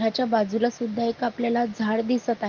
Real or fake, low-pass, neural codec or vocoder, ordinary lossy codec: real; 7.2 kHz; none; Opus, 32 kbps